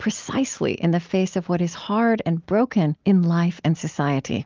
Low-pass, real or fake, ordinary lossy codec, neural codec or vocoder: 7.2 kHz; real; Opus, 24 kbps; none